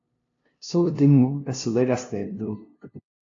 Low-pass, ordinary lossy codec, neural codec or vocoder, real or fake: 7.2 kHz; AAC, 48 kbps; codec, 16 kHz, 0.5 kbps, FunCodec, trained on LibriTTS, 25 frames a second; fake